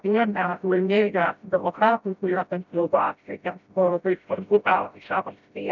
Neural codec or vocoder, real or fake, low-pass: codec, 16 kHz, 0.5 kbps, FreqCodec, smaller model; fake; 7.2 kHz